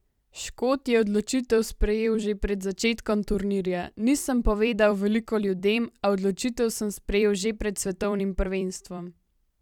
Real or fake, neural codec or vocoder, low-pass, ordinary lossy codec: fake; vocoder, 44.1 kHz, 128 mel bands every 256 samples, BigVGAN v2; 19.8 kHz; none